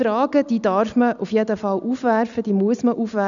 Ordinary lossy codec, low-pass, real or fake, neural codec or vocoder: none; 7.2 kHz; real; none